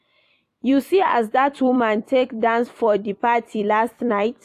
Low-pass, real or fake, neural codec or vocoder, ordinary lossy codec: 14.4 kHz; fake; vocoder, 44.1 kHz, 128 mel bands every 256 samples, BigVGAN v2; AAC, 64 kbps